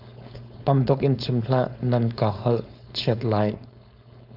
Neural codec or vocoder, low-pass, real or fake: codec, 16 kHz, 4.8 kbps, FACodec; 5.4 kHz; fake